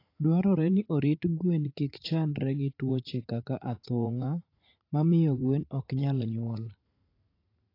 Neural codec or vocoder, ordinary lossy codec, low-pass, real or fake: vocoder, 44.1 kHz, 80 mel bands, Vocos; AAC, 32 kbps; 5.4 kHz; fake